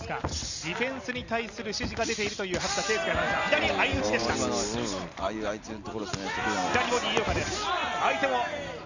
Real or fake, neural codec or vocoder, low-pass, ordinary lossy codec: real; none; 7.2 kHz; none